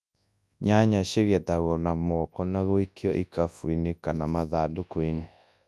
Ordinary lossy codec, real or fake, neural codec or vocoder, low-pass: none; fake; codec, 24 kHz, 0.9 kbps, WavTokenizer, large speech release; none